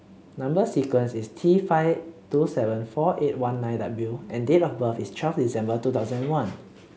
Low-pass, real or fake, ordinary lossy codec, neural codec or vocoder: none; real; none; none